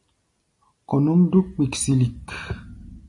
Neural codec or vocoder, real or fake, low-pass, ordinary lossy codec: none; real; 10.8 kHz; AAC, 64 kbps